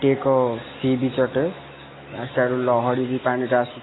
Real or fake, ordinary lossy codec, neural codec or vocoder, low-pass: real; AAC, 16 kbps; none; 7.2 kHz